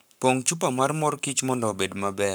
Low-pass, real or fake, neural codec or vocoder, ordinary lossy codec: none; fake; codec, 44.1 kHz, 7.8 kbps, Pupu-Codec; none